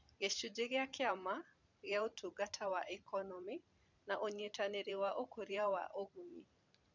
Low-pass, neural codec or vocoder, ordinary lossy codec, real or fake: 7.2 kHz; vocoder, 44.1 kHz, 128 mel bands every 512 samples, BigVGAN v2; none; fake